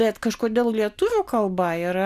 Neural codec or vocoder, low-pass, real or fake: none; 14.4 kHz; real